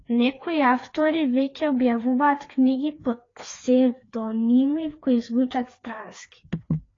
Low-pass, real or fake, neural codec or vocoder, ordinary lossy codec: 7.2 kHz; fake; codec, 16 kHz, 2 kbps, FreqCodec, larger model; AAC, 32 kbps